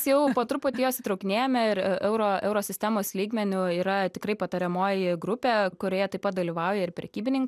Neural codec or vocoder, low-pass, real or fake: none; 14.4 kHz; real